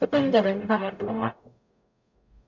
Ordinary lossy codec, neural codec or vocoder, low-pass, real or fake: MP3, 64 kbps; codec, 44.1 kHz, 0.9 kbps, DAC; 7.2 kHz; fake